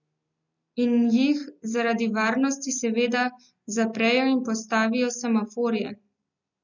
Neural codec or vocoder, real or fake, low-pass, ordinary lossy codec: none; real; 7.2 kHz; none